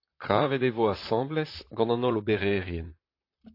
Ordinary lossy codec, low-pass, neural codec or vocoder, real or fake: AAC, 32 kbps; 5.4 kHz; vocoder, 44.1 kHz, 128 mel bands, Pupu-Vocoder; fake